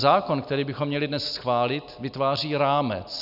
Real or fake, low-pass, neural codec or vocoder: real; 5.4 kHz; none